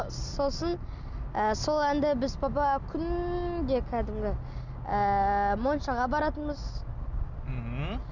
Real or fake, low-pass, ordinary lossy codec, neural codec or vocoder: real; 7.2 kHz; none; none